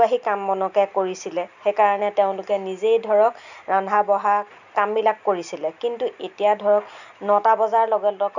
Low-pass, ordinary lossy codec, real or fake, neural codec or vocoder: 7.2 kHz; none; real; none